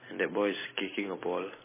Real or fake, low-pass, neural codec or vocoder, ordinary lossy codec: real; 3.6 kHz; none; MP3, 16 kbps